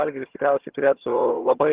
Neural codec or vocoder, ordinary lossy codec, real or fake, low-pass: vocoder, 22.05 kHz, 80 mel bands, HiFi-GAN; Opus, 16 kbps; fake; 3.6 kHz